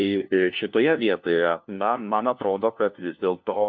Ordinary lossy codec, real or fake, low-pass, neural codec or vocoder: MP3, 64 kbps; fake; 7.2 kHz; codec, 16 kHz, 1 kbps, FunCodec, trained on LibriTTS, 50 frames a second